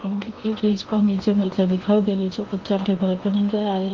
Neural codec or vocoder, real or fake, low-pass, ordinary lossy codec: codec, 16 kHz in and 24 kHz out, 0.8 kbps, FocalCodec, streaming, 65536 codes; fake; 7.2 kHz; Opus, 24 kbps